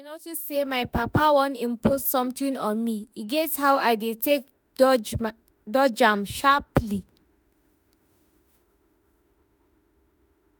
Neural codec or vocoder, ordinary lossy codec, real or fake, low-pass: autoencoder, 48 kHz, 32 numbers a frame, DAC-VAE, trained on Japanese speech; none; fake; none